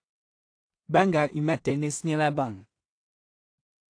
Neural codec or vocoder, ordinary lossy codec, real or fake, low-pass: codec, 16 kHz in and 24 kHz out, 0.4 kbps, LongCat-Audio-Codec, two codebook decoder; AAC, 48 kbps; fake; 9.9 kHz